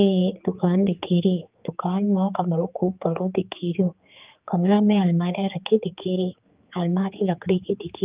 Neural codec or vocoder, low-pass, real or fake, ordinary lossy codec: codec, 16 kHz, 4 kbps, X-Codec, HuBERT features, trained on general audio; 3.6 kHz; fake; Opus, 32 kbps